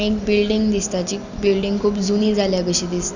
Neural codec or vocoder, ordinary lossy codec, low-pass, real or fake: none; none; 7.2 kHz; real